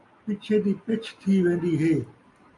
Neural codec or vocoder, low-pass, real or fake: none; 10.8 kHz; real